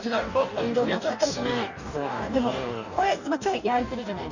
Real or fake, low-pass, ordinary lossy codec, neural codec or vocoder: fake; 7.2 kHz; none; codec, 44.1 kHz, 2.6 kbps, DAC